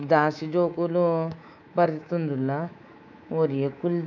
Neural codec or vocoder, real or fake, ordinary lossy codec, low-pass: codec, 24 kHz, 3.1 kbps, DualCodec; fake; none; 7.2 kHz